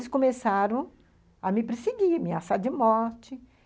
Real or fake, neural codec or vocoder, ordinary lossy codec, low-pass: real; none; none; none